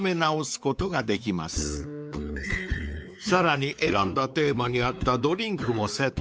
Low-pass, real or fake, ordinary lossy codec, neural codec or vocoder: none; fake; none; codec, 16 kHz, 4 kbps, X-Codec, WavLM features, trained on Multilingual LibriSpeech